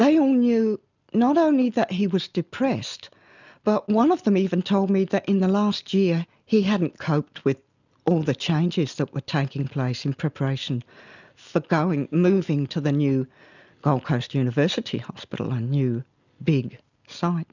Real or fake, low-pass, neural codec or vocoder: real; 7.2 kHz; none